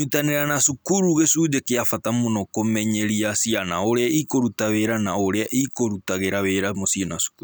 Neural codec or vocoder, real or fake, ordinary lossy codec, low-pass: none; real; none; none